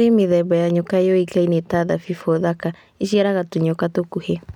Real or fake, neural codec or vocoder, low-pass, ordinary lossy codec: real; none; 19.8 kHz; none